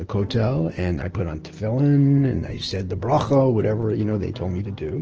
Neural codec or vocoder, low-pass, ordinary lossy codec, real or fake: none; 7.2 kHz; Opus, 16 kbps; real